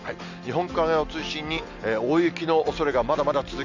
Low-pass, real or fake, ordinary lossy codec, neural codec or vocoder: 7.2 kHz; real; none; none